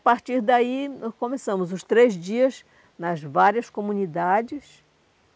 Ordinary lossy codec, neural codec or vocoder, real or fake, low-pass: none; none; real; none